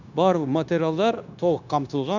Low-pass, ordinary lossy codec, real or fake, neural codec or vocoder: 7.2 kHz; none; fake; codec, 16 kHz, 0.9 kbps, LongCat-Audio-Codec